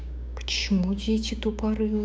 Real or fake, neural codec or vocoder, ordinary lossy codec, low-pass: fake; codec, 16 kHz, 6 kbps, DAC; none; none